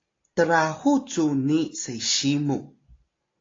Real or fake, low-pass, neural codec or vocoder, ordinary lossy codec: real; 7.2 kHz; none; AAC, 32 kbps